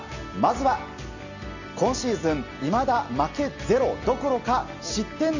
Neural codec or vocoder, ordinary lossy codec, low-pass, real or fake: none; none; 7.2 kHz; real